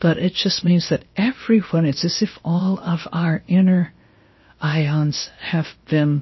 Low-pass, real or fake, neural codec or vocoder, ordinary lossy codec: 7.2 kHz; fake; codec, 16 kHz, about 1 kbps, DyCAST, with the encoder's durations; MP3, 24 kbps